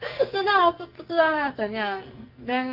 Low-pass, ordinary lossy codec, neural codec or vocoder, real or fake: 5.4 kHz; Opus, 24 kbps; codec, 44.1 kHz, 2.6 kbps, SNAC; fake